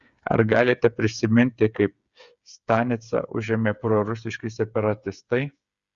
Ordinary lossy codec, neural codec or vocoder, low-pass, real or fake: Opus, 64 kbps; codec, 16 kHz, 8 kbps, FreqCodec, smaller model; 7.2 kHz; fake